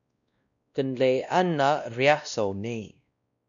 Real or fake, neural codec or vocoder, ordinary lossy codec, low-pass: fake; codec, 16 kHz, 1 kbps, X-Codec, WavLM features, trained on Multilingual LibriSpeech; MP3, 96 kbps; 7.2 kHz